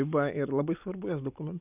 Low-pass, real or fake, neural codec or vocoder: 3.6 kHz; real; none